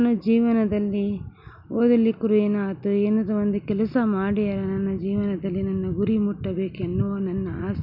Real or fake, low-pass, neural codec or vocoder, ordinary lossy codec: real; 5.4 kHz; none; none